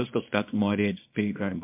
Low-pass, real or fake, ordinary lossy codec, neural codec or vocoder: 3.6 kHz; fake; MP3, 32 kbps; codec, 24 kHz, 0.9 kbps, WavTokenizer, small release